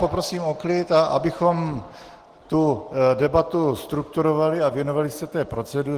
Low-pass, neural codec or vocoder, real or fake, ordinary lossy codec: 14.4 kHz; none; real; Opus, 16 kbps